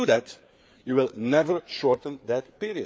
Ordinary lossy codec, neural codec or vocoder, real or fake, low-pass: none; codec, 16 kHz, 4 kbps, FreqCodec, larger model; fake; none